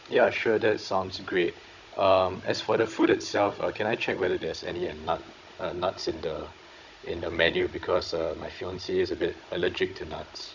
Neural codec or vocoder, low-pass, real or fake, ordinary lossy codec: codec, 16 kHz, 16 kbps, FunCodec, trained on Chinese and English, 50 frames a second; 7.2 kHz; fake; none